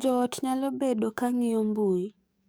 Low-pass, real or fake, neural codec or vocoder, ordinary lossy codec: none; fake; codec, 44.1 kHz, 7.8 kbps, DAC; none